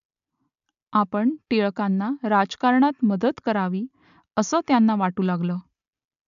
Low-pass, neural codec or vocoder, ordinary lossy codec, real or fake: 7.2 kHz; none; AAC, 96 kbps; real